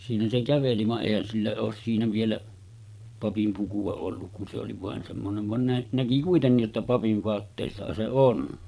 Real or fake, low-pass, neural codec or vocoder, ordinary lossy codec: fake; none; vocoder, 22.05 kHz, 80 mel bands, Vocos; none